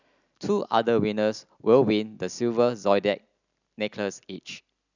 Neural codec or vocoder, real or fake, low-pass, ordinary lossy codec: none; real; 7.2 kHz; none